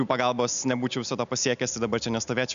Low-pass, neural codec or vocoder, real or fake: 7.2 kHz; none; real